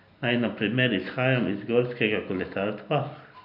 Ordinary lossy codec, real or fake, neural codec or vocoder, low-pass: none; real; none; 5.4 kHz